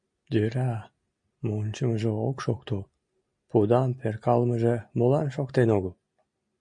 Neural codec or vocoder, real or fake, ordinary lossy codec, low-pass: none; real; MP3, 48 kbps; 9.9 kHz